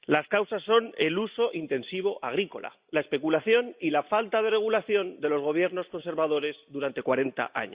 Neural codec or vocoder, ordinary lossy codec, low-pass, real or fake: none; Opus, 64 kbps; 3.6 kHz; real